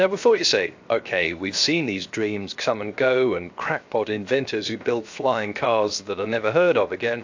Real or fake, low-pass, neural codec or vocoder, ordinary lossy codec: fake; 7.2 kHz; codec, 16 kHz, 0.7 kbps, FocalCodec; AAC, 48 kbps